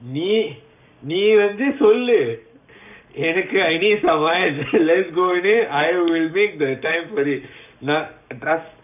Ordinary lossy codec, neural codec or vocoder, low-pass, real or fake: none; codec, 44.1 kHz, 7.8 kbps, Pupu-Codec; 3.6 kHz; fake